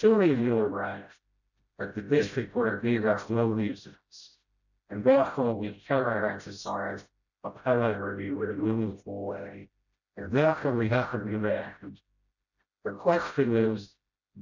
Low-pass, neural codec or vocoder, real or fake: 7.2 kHz; codec, 16 kHz, 0.5 kbps, FreqCodec, smaller model; fake